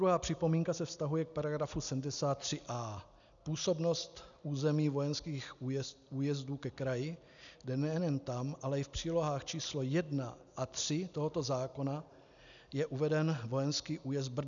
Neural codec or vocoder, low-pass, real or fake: none; 7.2 kHz; real